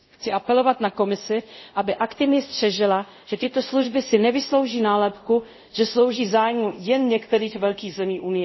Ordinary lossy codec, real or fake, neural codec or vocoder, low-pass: MP3, 24 kbps; fake; codec, 24 kHz, 0.5 kbps, DualCodec; 7.2 kHz